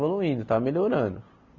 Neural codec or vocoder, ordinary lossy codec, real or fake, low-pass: none; none; real; 7.2 kHz